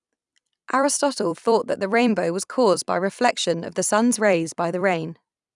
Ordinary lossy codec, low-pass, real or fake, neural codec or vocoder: none; 10.8 kHz; fake; vocoder, 44.1 kHz, 128 mel bands every 256 samples, BigVGAN v2